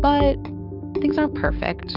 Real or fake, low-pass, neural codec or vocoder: fake; 5.4 kHz; codec, 16 kHz, 6 kbps, DAC